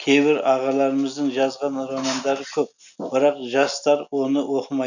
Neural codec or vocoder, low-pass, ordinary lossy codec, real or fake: none; 7.2 kHz; none; real